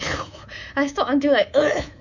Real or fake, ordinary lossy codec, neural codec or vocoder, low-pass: fake; none; codec, 24 kHz, 3.1 kbps, DualCodec; 7.2 kHz